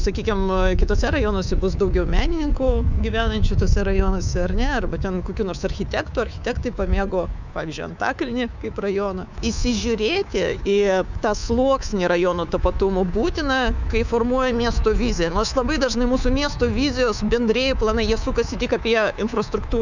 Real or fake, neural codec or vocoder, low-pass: fake; codec, 24 kHz, 3.1 kbps, DualCodec; 7.2 kHz